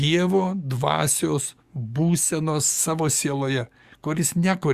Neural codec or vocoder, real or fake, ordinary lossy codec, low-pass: codec, 44.1 kHz, 7.8 kbps, DAC; fake; Opus, 64 kbps; 14.4 kHz